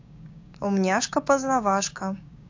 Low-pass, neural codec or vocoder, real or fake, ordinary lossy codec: 7.2 kHz; codec, 16 kHz, 6 kbps, DAC; fake; AAC, 48 kbps